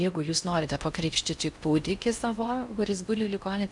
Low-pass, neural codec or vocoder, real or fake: 10.8 kHz; codec, 16 kHz in and 24 kHz out, 0.6 kbps, FocalCodec, streaming, 4096 codes; fake